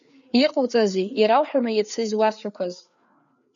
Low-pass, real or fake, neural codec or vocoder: 7.2 kHz; fake; codec, 16 kHz, 4 kbps, FreqCodec, larger model